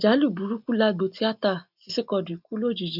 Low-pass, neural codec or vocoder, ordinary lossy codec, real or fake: 5.4 kHz; none; none; real